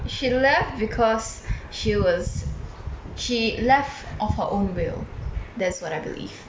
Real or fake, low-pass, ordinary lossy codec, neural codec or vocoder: real; none; none; none